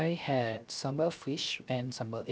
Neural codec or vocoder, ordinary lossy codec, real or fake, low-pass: codec, 16 kHz, 0.7 kbps, FocalCodec; none; fake; none